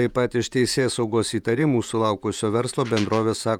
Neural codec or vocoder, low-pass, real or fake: vocoder, 48 kHz, 128 mel bands, Vocos; 19.8 kHz; fake